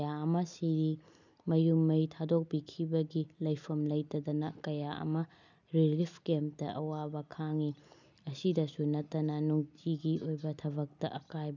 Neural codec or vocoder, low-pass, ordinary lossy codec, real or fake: none; 7.2 kHz; none; real